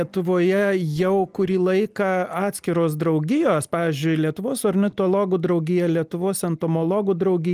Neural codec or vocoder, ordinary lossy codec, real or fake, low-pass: none; Opus, 32 kbps; real; 14.4 kHz